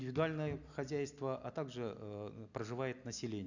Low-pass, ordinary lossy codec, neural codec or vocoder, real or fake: 7.2 kHz; none; none; real